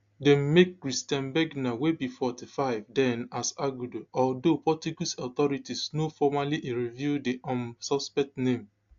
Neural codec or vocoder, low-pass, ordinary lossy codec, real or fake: none; 7.2 kHz; AAC, 64 kbps; real